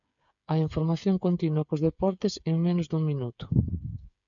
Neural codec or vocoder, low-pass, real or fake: codec, 16 kHz, 8 kbps, FreqCodec, smaller model; 7.2 kHz; fake